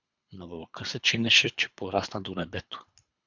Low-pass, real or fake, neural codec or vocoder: 7.2 kHz; fake; codec, 24 kHz, 3 kbps, HILCodec